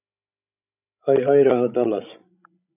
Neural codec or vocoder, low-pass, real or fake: codec, 16 kHz, 16 kbps, FreqCodec, larger model; 3.6 kHz; fake